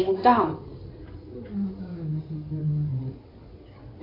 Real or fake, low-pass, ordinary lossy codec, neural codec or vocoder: fake; 5.4 kHz; AAC, 24 kbps; codec, 16 kHz in and 24 kHz out, 2.2 kbps, FireRedTTS-2 codec